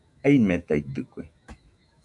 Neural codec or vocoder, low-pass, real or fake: autoencoder, 48 kHz, 128 numbers a frame, DAC-VAE, trained on Japanese speech; 10.8 kHz; fake